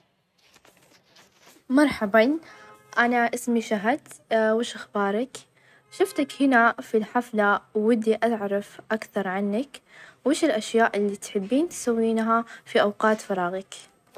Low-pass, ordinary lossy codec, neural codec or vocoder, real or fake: 14.4 kHz; none; none; real